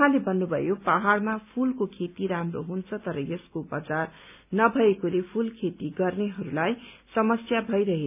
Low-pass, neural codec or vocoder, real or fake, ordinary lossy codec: 3.6 kHz; none; real; none